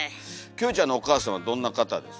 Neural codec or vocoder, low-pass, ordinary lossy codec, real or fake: none; none; none; real